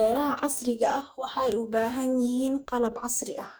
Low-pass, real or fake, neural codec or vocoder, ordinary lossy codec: none; fake; codec, 44.1 kHz, 2.6 kbps, DAC; none